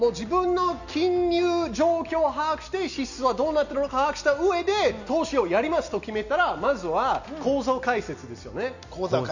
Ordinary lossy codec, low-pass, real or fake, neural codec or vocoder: none; 7.2 kHz; real; none